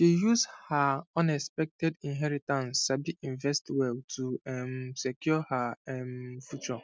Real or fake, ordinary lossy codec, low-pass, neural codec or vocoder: real; none; none; none